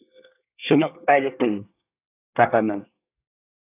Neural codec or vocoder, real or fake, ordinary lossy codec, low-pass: codec, 24 kHz, 1 kbps, SNAC; fake; AAC, 24 kbps; 3.6 kHz